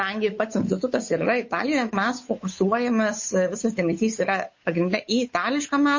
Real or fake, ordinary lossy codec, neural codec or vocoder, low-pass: fake; MP3, 32 kbps; codec, 16 kHz, 8 kbps, FunCodec, trained on Chinese and English, 25 frames a second; 7.2 kHz